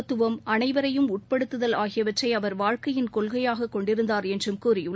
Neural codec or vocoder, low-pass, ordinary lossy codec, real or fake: none; none; none; real